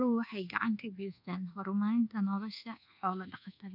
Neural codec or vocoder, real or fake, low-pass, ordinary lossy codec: codec, 24 kHz, 1.2 kbps, DualCodec; fake; 5.4 kHz; none